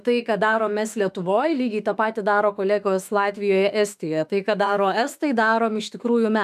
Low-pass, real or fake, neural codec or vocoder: 14.4 kHz; fake; autoencoder, 48 kHz, 32 numbers a frame, DAC-VAE, trained on Japanese speech